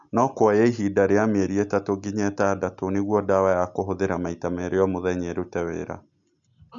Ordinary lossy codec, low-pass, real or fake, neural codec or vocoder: none; 7.2 kHz; real; none